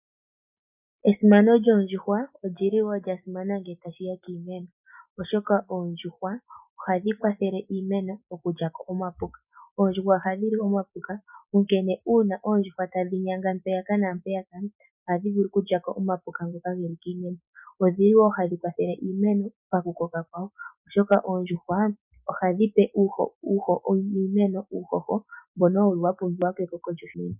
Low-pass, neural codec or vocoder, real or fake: 3.6 kHz; none; real